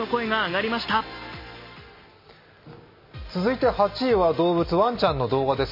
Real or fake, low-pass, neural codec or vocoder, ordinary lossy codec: real; 5.4 kHz; none; MP3, 24 kbps